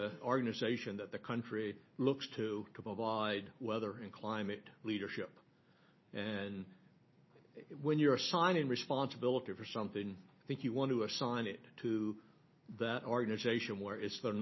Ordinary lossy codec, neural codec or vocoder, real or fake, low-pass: MP3, 24 kbps; none; real; 7.2 kHz